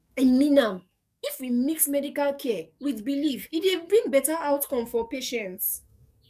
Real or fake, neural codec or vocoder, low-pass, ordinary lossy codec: fake; codec, 44.1 kHz, 7.8 kbps, DAC; 14.4 kHz; none